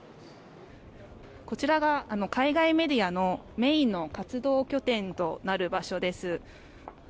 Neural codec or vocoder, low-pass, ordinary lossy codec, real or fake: none; none; none; real